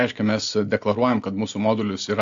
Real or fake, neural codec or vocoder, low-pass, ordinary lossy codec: real; none; 7.2 kHz; AAC, 64 kbps